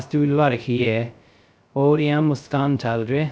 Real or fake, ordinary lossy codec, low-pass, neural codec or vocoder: fake; none; none; codec, 16 kHz, 0.2 kbps, FocalCodec